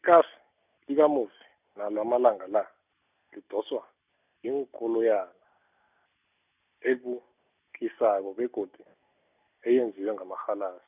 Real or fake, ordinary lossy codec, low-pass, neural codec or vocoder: real; none; 3.6 kHz; none